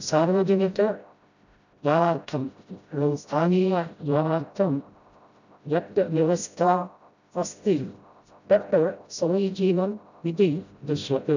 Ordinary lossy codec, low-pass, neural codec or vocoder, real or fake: none; 7.2 kHz; codec, 16 kHz, 0.5 kbps, FreqCodec, smaller model; fake